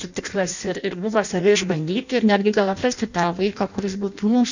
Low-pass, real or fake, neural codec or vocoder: 7.2 kHz; fake; codec, 16 kHz in and 24 kHz out, 0.6 kbps, FireRedTTS-2 codec